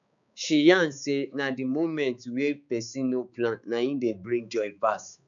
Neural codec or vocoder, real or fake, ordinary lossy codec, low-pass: codec, 16 kHz, 4 kbps, X-Codec, HuBERT features, trained on balanced general audio; fake; MP3, 96 kbps; 7.2 kHz